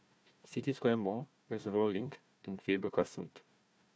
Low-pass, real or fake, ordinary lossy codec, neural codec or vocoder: none; fake; none; codec, 16 kHz, 1 kbps, FunCodec, trained on Chinese and English, 50 frames a second